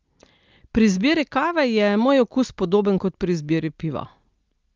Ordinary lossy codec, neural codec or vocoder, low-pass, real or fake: Opus, 24 kbps; none; 7.2 kHz; real